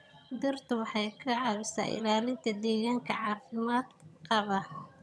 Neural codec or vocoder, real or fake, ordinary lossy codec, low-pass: vocoder, 22.05 kHz, 80 mel bands, HiFi-GAN; fake; none; none